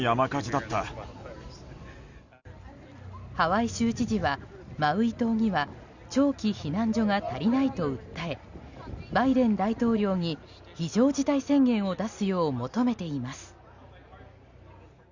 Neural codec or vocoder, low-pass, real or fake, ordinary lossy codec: none; 7.2 kHz; real; Opus, 64 kbps